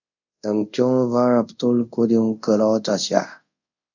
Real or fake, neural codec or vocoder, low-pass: fake; codec, 24 kHz, 0.5 kbps, DualCodec; 7.2 kHz